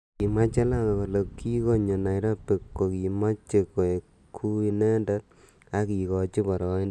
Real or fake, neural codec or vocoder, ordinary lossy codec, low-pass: real; none; none; none